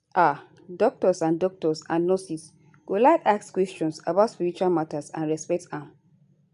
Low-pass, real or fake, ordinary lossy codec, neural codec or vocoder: 10.8 kHz; real; none; none